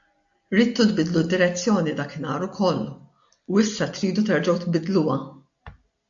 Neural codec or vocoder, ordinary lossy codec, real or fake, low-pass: none; AAC, 64 kbps; real; 7.2 kHz